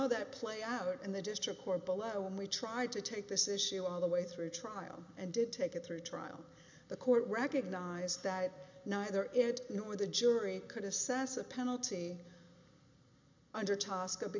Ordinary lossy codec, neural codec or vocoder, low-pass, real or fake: MP3, 64 kbps; none; 7.2 kHz; real